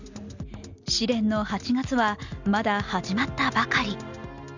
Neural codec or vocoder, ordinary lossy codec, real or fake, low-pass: none; none; real; 7.2 kHz